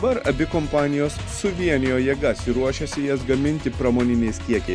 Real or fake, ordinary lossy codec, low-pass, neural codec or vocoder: real; Opus, 64 kbps; 9.9 kHz; none